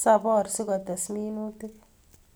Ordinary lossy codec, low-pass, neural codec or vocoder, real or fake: none; none; none; real